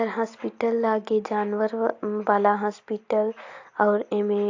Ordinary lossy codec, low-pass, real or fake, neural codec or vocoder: AAC, 48 kbps; 7.2 kHz; real; none